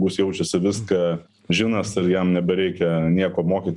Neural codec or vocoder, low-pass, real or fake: none; 10.8 kHz; real